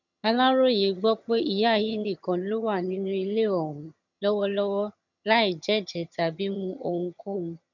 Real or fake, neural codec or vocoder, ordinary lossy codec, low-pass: fake; vocoder, 22.05 kHz, 80 mel bands, HiFi-GAN; none; 7.2 kHz